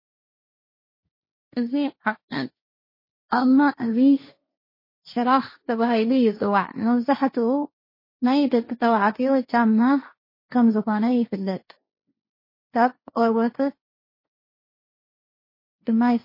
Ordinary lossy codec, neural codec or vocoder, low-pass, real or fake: MP3, 24 kbps; codec, 16 kHz, 1.1 kbps, Voila-Tokenizer; 5.4 kHz; fake